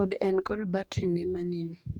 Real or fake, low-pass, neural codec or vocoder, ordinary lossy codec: fake; 19.8 kHz; codec, 44.1 kHz, 2.6 kbps, DAC; none